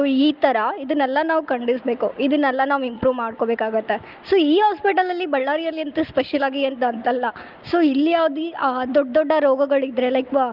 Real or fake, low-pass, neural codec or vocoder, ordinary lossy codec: real; 5.4 kHz; none; Opus, 24 kbps